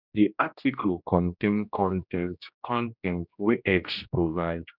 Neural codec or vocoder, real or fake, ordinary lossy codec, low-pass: codec, 16 kHz, 1 kbps, X-Codec, HuBERT features, trained on general audio; fake; none; 5.4 kHz